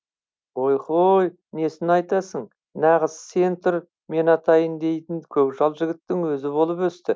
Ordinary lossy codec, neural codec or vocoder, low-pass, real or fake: none; none; none; real